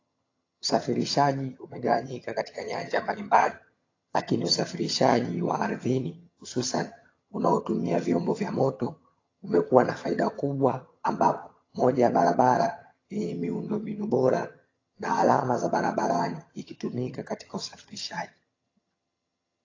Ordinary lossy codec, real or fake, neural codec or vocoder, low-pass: AAC, 32 kbps; fake; vocoder, 22.05 kHz, 80 mel bands, HiFi-GAN; 7.2 kHz